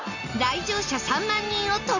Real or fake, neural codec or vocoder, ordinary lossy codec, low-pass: real; none; none; 7.2 kHz